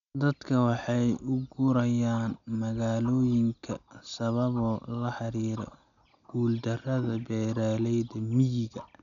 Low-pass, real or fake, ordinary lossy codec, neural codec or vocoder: 7.2 kHz; real; none; none